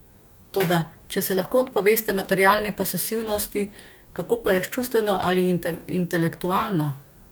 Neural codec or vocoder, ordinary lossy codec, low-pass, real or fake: codec, 44.1 kHz, 2.6 kbps, DAC; none; none; fake